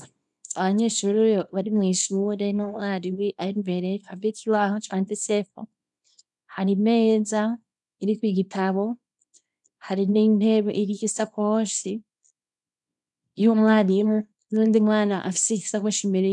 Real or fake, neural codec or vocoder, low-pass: fake; codec, 24 kHz, 0.9 kbps, WavTokenizer, small release; 10.8 kHz